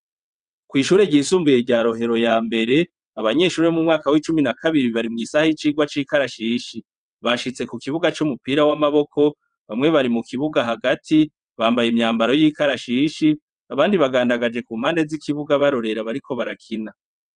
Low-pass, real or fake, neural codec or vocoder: 9.9 kHz; fake; vocoder, 22.05 kHz, 80 mel bands, WaveNeXt